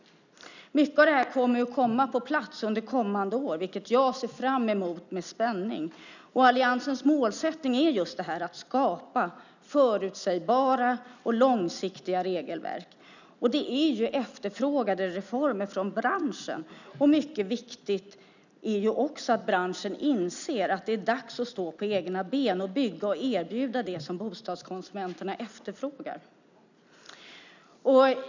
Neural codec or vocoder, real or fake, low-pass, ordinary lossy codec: none; real; 7.2 kHz; none